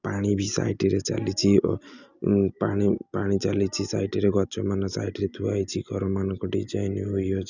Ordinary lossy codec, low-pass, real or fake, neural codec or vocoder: none; 7.2 kHz; real; none